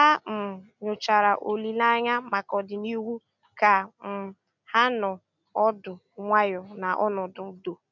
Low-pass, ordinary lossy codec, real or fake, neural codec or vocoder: 7.2 kHz; none; real; none